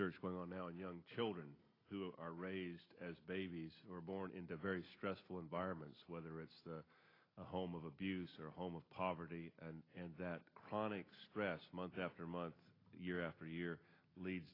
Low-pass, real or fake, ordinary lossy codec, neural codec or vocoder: 5.4 kHz; real; AAC, 24 kbps; none